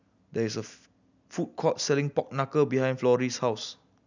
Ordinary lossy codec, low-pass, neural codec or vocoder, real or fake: none; 7.2 kHz; none; real